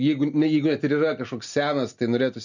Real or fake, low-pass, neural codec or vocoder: real; 7.2 kHz; none